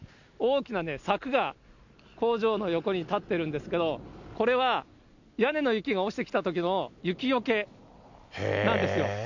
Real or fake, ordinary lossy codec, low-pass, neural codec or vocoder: real; none; 7.2 kHz; none